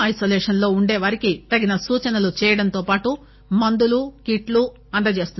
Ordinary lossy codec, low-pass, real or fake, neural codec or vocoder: MP3, 24 kbps; 7.2 kHz; real; none